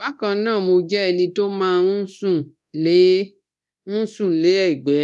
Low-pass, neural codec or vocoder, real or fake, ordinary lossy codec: none; codec, 24 kHz, 0.9 kbps, DualCodec; fake; none